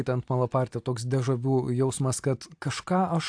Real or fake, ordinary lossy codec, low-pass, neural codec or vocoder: real; AAC, 64 kbps; 9.9 kHz; none